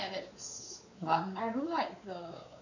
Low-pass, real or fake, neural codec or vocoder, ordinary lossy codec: 7.2 kHz; fake; codec, 16 kHz, 4 kbps, X-Codec, WavLM features, trained on Multilingual LibriSpeech; none